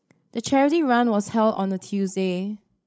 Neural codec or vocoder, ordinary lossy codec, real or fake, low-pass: codec, 16 kHz, 16 kbps, FreqCodec, larger model; none; fake; none